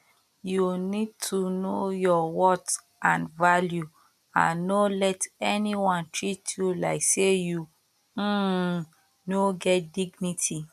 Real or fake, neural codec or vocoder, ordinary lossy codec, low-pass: real; none; none; 14.4 kHz